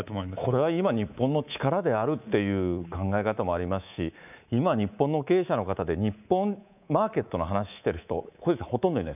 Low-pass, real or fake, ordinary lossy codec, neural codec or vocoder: 3.6 kHz; fake; none; codec, 24 kHz, 3.1 kbps, DualCodec